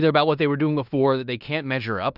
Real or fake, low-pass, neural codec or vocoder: fake; 5.4 kHz; codec, 16 kHz in and 24 kHz out, 0.9 kbps, LongCat-Audio-Codec, fine tuned four codebook decoder